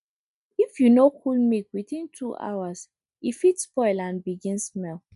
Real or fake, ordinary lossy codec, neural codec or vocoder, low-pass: real; none; none; 10.8 kHz